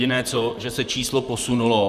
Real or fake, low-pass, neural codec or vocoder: fake; 14.4 kHz; vocoder, 44.1 kHz, 128 mel bands, Pupu-Vocoder